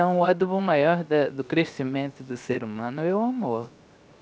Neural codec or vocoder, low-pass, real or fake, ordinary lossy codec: codec, 16 kHz, 0.7 kbps, FocalCodec; none; fake; none